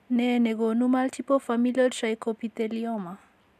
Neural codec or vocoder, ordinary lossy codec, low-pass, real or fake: none; none; 14.4 kHz; real